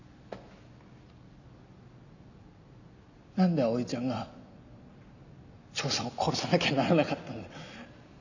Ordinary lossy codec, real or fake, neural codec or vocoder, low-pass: none; real; none; 7.2 kHz